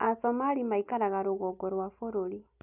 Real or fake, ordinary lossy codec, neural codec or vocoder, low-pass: real; none; none; 3.6 kHz